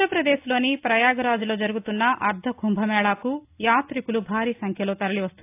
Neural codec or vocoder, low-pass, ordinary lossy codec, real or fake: none; 3.6 kHz; AAC, 32 kbps; real